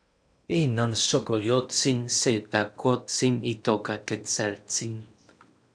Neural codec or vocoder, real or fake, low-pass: codec, 16 kHz in and 24 kHz out, 0.8 kbps, FocalCodec, streaming, 65536 codes; fake; 9.9 kHz